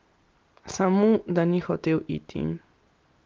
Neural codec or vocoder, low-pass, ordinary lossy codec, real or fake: none; 7.2 kHz; Opus, 24 kbps; real